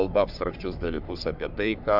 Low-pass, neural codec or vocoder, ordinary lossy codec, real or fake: 5.4 kHz; codec, 44.1 kHz, 3.4 kbps, Pupu-Codec; AAC, 48 kbps; fake